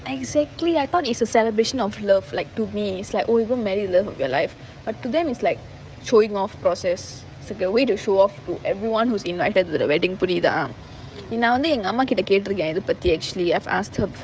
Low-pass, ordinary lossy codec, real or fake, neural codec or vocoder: none; none; fake; codec, 16 kHz, 16 kbps, FreqCodec, smaller model